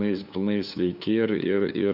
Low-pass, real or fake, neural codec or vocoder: 5.4 kHz; fake; codec, 16 kHz, 2 kbps, FunCodec, trained on LibriTTS, 25 frames a second